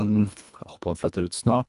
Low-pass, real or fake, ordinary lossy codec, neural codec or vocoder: 10.8 kHz; fake; none; codec, 24 kHz, 1.5 kbps, HILCodec